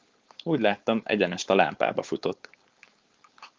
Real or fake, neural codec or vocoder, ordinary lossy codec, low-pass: fake; codec, 16 kHz, 4.8 kbps, FACodec; Opus, 32 kbps; 7.2 kHz